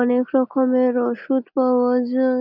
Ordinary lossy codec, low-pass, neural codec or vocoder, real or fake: none; 5.4 kHz; none; real